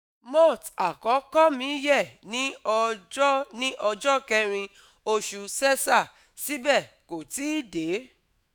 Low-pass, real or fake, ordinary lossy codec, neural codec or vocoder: none; fake; none; autoencoder, 48 kHz, 128 numbers a frame, DAC-VAE, trained on Japanese speech